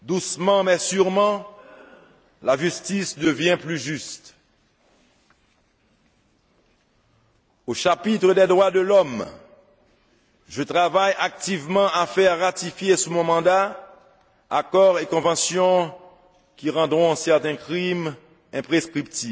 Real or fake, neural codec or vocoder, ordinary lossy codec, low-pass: real; none; none; none